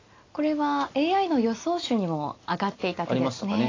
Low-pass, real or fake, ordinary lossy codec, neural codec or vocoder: 7.2 kHz; real; AAC, 32 kbps; none